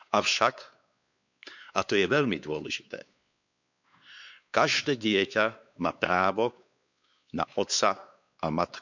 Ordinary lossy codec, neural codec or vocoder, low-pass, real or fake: none; codec, 16 kHz, 4 kbps, X-Codec, WavLM features, trained on Multilingual LibriSpeech; 7.2 kHz; fake